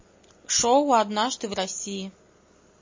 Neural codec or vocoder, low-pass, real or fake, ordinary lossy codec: none; 7.2 kHz; real; MP3, 32 kbps